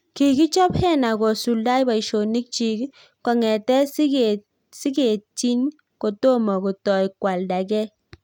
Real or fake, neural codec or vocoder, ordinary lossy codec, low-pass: real; none; none; 19.8 kHz